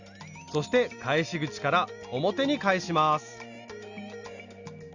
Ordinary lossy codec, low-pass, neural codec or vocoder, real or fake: Opus, 64 kbps; 7.2 kHz; none; real